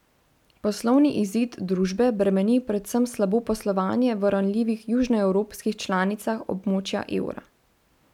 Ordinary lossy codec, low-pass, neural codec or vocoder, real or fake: none; 19.8 kHz; none; real